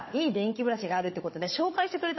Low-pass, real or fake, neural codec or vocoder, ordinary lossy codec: 7.2 kHz; fake; codec, 16 kHz, 4 kbps, FunCodec, trained on Chinese and English, 50 frames a second; MP3, 24 kbps